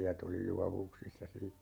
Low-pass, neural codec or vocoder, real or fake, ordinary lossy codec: none; vocoder, 48 kHz, 128 mel bands, Vocos; fake; none